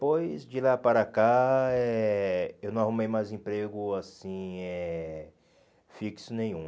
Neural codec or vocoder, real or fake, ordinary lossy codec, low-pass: none; real; none; none